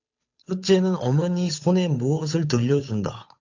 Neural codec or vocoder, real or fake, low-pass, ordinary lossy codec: codec, 16 kHz, 8 kbps, FunCodec, trained on Chinese and English, 25 frames a second; fake; 7.2 kHz; AAC, 48 kbps